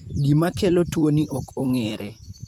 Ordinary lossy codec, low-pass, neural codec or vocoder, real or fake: none; 19.8 kHz; vocoder, 44.1 kHz, 128 mel bands, Pupu-Vocoder; fake